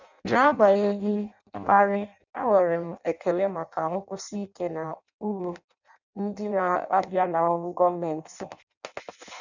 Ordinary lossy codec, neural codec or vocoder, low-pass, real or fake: none; codec, 16 kHz in and 24 kHz out, 0.6 kbps, FireRedTTS-2 codec; 7.2 kHz; fake